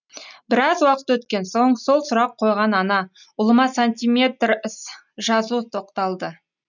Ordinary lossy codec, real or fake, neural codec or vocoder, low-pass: none; real; none; 7.2 kHz